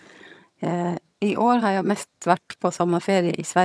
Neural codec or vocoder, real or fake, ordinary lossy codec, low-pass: vocoder, 22.05 kHz, 80 mel bands, HiFi-GAN; fake; none; none